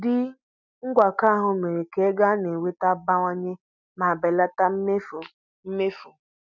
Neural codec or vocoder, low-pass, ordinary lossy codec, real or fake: none; 7.2 kHz; none; real